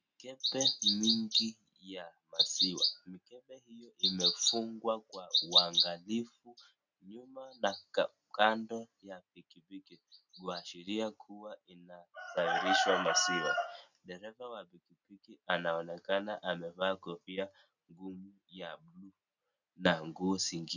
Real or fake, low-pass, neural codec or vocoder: real; 7.2 kHz; none